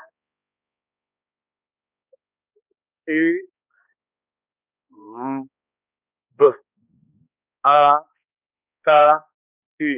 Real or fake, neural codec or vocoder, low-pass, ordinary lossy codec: fake; codec, 16 kHz, 2 kbps, X-Codec, HuBERT features, trained on balanced general audio; 3.6 kHz; none